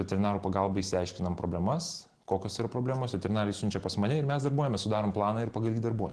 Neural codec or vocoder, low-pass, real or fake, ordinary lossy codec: none; 10.8 kHz; real; Opus, 16 kbps